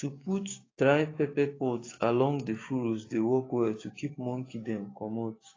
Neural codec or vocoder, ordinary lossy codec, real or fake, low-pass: codec, 16 kHz, 6 kbps, DAC; AAC, 32 kbps; fake; 7.2 kHz